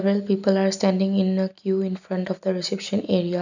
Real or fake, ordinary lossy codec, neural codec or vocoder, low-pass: real; none; none; 7.2 kHz